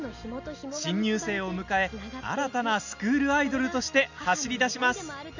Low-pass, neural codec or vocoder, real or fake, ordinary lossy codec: 7.2 kHz; none; real; none